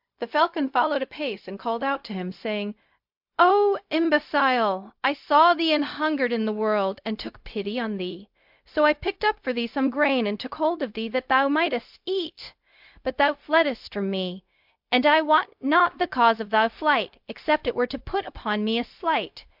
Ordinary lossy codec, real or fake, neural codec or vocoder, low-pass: MP3, 48 kbps; fake; codec, 16 kHz, 0.4 kbps, LongCat-Audio-Codec; 5.4 kHz